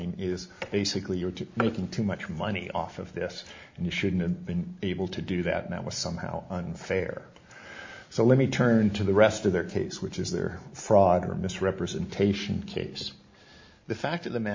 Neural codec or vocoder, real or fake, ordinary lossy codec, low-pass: none; real; MP3, 48 kbps; 7.2 kHz